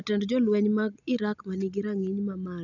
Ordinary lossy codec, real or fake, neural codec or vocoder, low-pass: none; real; none; 7.2 kHz